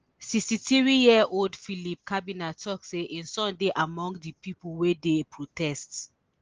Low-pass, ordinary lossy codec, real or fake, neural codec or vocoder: 7.2 kHz; Opus, 16 kbps; real; none